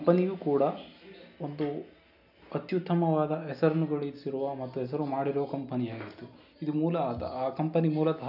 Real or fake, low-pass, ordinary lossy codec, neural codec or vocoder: real; 5.4 kHz; none; none